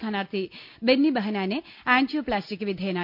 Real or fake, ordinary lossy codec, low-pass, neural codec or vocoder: real; none; 5.4 kHz; none